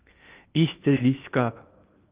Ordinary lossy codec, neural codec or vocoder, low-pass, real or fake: Opus, 32 kbps; codec, 16 kHz in and 24 kHz out, 0.8 kbps, FocalCodec, streaming, 65536 codes; 3.6 kHz; fake